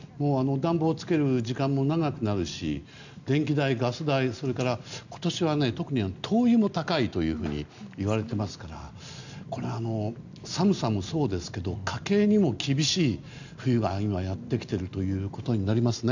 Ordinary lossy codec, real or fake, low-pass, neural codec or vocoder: none; real; 7.2 kHz; none